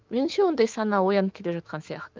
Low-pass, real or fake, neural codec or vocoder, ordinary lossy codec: 7.2 kHz; fake; autoencoder, 48 kHz, 128 numbers a frame, DAC-VAE, trained on Japanese speech; Opus, 32 kbps